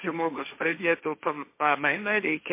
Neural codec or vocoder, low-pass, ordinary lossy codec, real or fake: codec, 16 kHz, 1.1 kbps, Voila-Tokenizer; 3.6 kHz; MP3, 24 kbps; fake